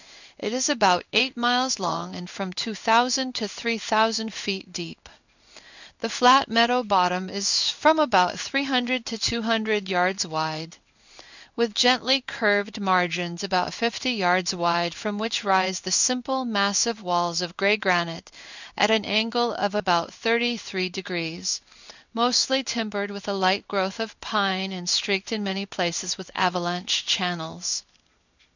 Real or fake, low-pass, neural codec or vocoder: fake; 7.2 kHz; codec, 16 kHz in and 24 kHz out, 1 kbps, XY-Tokenizer